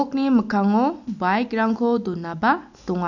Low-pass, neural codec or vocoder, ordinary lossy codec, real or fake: 7.2 kHz; none; none; real